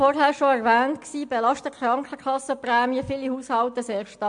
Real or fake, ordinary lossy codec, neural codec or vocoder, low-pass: real; MP3, 64 kbps; none; 9.9 kHz